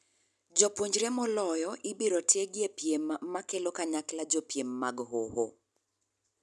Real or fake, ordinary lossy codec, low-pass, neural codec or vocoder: real; none; none; none